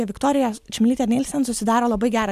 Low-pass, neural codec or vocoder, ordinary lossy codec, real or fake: 14.4 kHz; none; AAC, 96 kbps; real